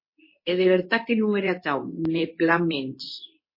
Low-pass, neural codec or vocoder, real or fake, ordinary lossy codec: 5.4 kHz; codec, 24 kHz, 0.9 kbps, WavTokenizer, medium speech release version 2; fake; MP3, 24 kbps